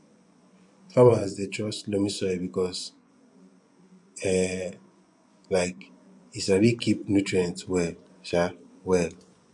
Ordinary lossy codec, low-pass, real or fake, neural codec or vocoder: MP3, 64 kbps; 10.8 kHz; fake; vocoder, 24 kHz, 100 mel bands, Vocos